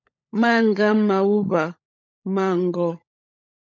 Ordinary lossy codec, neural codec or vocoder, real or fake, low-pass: MP3, 64 kbps; codec, 16 kHz, 16 kbps, FunCodec, trained on LibriTTS, 50 frames a second; fake; 7.2 kHz